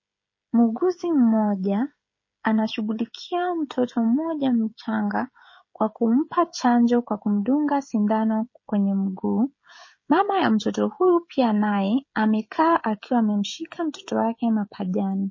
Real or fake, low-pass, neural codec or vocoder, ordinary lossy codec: fake; 7.2 kHz; codec, 16 kHz, 16 kbps, FreqCodec, smaller model; MP3, 32 kbps